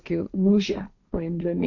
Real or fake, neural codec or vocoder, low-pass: fake; codec, 16 kHz, 1.1 kbps, Voila-Tokenizer; 7.2 kHz